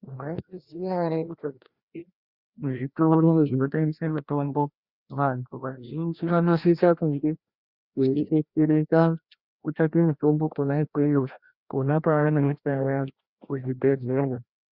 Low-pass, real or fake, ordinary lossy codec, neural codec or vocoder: 5.4 kHz; fake; Opus, 64 kbps; codec, 16 kHz, 1 kbps, FreqCodec, larger model